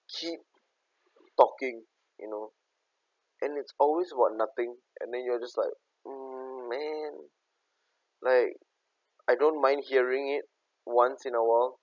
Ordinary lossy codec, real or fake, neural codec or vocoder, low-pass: none; real; none; 7.2 kHz